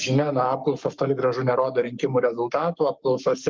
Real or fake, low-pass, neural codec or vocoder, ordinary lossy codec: fake; 7.2 kHz; codec, 44.1 kHz, 7.8 kbps, Pupu-Codec; Opus, 24 kbps